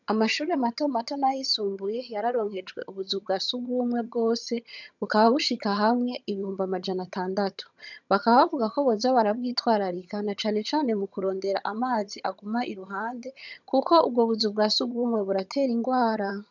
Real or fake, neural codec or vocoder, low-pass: fake; vocoder, 22.05 kHz, 80 mel bands, HiFi-GAN; 7.2 kHz